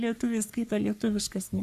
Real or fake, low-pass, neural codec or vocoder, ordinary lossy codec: fake; 14.4 kHz; codec, 44.1 kHz, 3.4 kbps, Pupu-Codec; AAC, 96 kbps